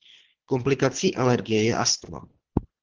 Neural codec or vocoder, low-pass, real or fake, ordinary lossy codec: codec, 24 kHz, 3 kbps, HILCodec; 7.2 kHz; fake; Opus, 16 kbps